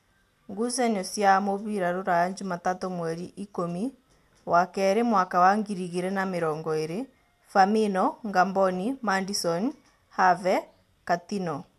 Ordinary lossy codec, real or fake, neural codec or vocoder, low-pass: MP3, 96 kbps; real; none; 14.4 kHz